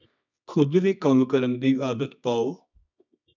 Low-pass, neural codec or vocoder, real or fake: 7.2 kHz; codec, 24 kHz, 0.9 kbps, WavTokenizer, medium music audio release; fake